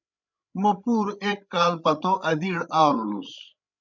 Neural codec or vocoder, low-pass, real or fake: codec, 16 kHz, 8 kbps, FreqCodec, larger model; 7.2 kHz; fake